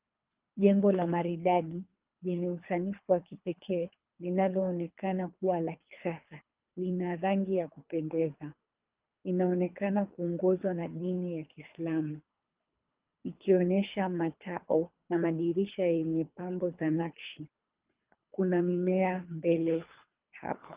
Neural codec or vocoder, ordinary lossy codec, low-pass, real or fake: codec, 24 kHz, 3 kbps, HILCodec; Opus, 24 kbps; 3.6 kHz; fake